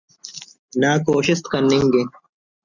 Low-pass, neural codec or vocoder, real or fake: 7.2 kHz; none; real